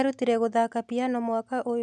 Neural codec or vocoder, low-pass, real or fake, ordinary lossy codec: none; none; real; none